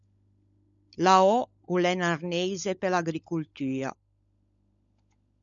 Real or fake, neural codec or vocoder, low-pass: fake; codec, 16 kHz, 16 kbps, FunCodec, trained on LibriTTS, 50 frames a second; 7.2 kHz